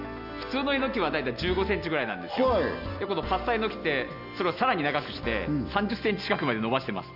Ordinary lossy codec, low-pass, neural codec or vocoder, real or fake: none; 5.4 kHz; none; real